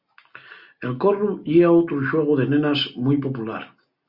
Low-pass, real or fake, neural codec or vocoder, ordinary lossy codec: 5.4 kHz; real; none; Opus, 64 kbps